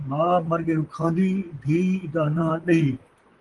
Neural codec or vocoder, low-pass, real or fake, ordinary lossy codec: vocoder, 44.1 kHz, 128 mel bands, Pupu-Vocoder; 10.8 kHz; fake; Opus, 24 kbps